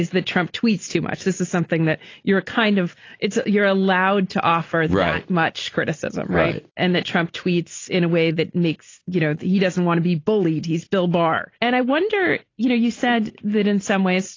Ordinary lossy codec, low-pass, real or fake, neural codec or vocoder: AAC, 32 kbps; 7.2 kHz; real; none